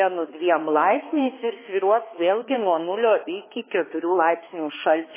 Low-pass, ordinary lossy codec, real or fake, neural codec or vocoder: 3.6 kHz; MP3, 16 kbps; fake; codec, 16 kHz, 2 kbps, X-Codec, HuBERT features, trained on balanced general audio